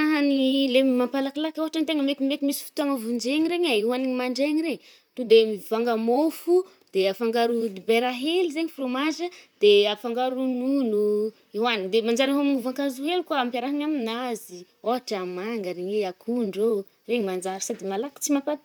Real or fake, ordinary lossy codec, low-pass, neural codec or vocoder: fake; none; none; vocoder, 44.1 kHz, 128 mel bands, Pupu-Vocoder